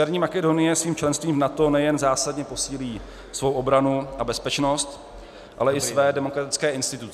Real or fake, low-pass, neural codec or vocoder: real; 14.4 kHz; none